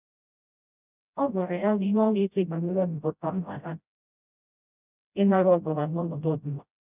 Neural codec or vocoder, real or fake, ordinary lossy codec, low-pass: codec, 16 kHz, 0.5 kbps, FreqCodec, smaller model; fake; none; 3.6 kHz